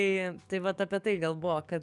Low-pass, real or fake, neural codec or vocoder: 10.8 kHz; fake; codec, 44.1 kHz, 7.8 kbps, DAC